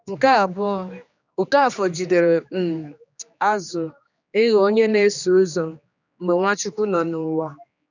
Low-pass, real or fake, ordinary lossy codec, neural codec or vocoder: 7.2 kHz; fake; none; codec, 16 kHz, 2 kbps, X-Codec, HuBERT features, trained on general audio